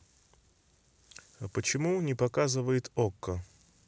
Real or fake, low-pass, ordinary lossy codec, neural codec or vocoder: real; none; none; none